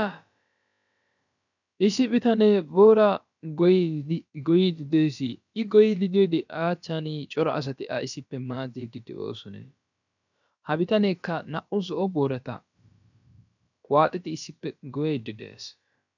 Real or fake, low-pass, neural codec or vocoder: fake; 7.2 kHz; codec, 16 kHz, about 1 kbps, DyCAST, with the encoder's durations